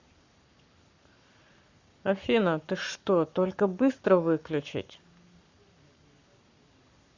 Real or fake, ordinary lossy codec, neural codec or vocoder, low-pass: fake; Opus, 64 kbps; codec, 44.1 kHz, 7.8 kbps, Pupu-Codec; 7.2 kHz